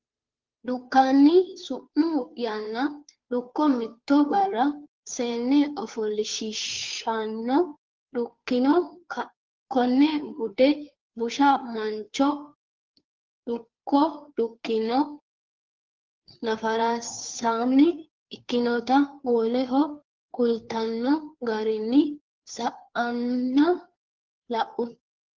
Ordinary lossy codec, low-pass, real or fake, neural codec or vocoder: Opus, 32 kbps; 7.2 kHz; fake; codec, 16 kHz, 2 kbps, FunCodec, trained on Chinese and English, 25 frames a second